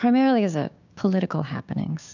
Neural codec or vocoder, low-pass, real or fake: codec, 16 kHz, 6 kbps, DAC; 7.2 kHz; fake